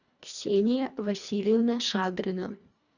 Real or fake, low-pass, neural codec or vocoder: fake; 7.2 kHz; codec, 24 kHz, 1.5 kbps, HILCodec